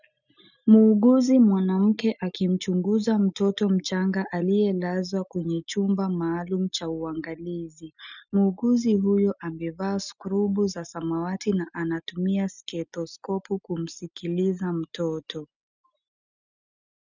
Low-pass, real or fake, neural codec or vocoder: 7.2 kHz; real; none